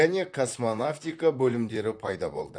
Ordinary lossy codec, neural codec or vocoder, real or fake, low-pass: none; vocoder, 44.1 kHz, 128 mel bands, Pupu-Vocoder; fake; 9.9 kHz